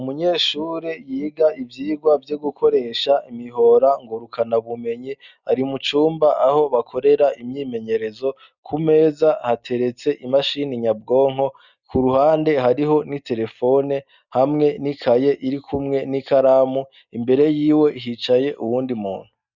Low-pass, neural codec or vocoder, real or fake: 7.2 kHz; none; real